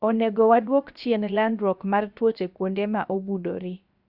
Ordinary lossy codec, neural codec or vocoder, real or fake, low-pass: Opus, 64 kbps; codec, 16 kHz, 0.7 kbps, FocalCodec; fake; 5.4 kHz